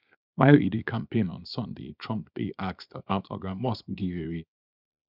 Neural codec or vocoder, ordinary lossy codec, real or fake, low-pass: codec, 24 kHz, 0.9 kbps, WavTokenizer, small release; none; fake; 5.4 kHz